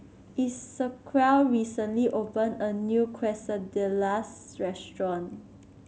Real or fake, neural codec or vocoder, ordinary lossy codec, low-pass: real; none; none; none